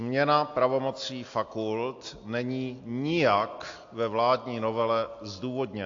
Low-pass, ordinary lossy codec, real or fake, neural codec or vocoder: 7.2 kHz; AAC, 48 kbps; real; none